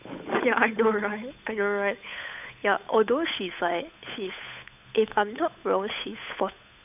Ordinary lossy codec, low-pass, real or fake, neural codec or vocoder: none; 3.6 kHz; fake; codec, 16 kHz, 8 kbps, FunCodec, trained on Chinese and English, 25 frames a second